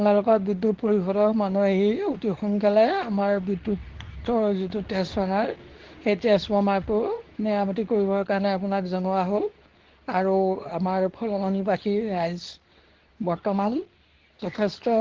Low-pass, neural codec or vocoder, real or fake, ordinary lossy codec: 7.2 kHz; codec, 24 kHz, 0.9 kbps, WavTokenizer, medium speech release version 2; fake; Opus, 16 kbps